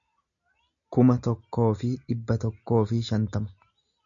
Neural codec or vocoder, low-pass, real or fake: none; 7.2 kHz; real